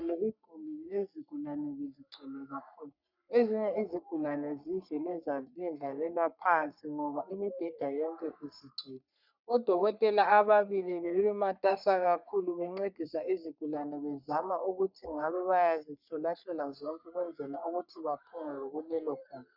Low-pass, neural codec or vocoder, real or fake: 5.4 kHz; codec, 44.1 kHz, 3.4 kbps, Pupu-Codec; fake